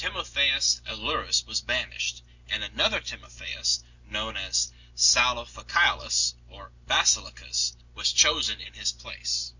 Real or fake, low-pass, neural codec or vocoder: real; 7.2 kHz; none